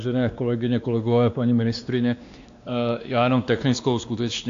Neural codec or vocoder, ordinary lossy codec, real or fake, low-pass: codec, 16 kHz, 2 kbps, X-Codec, WavLM features, trained on Multilingual LibriSpeech; AAC, 64 kbps; fake; 7.2 kHz